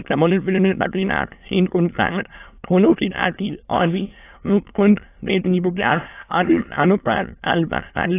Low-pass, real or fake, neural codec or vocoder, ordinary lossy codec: 3.6 kHz; fake; autoencoder, 22.05 kHz, a latent of 192 numbers a frame, VITS, trained on many speakers; AAC, 24 kbps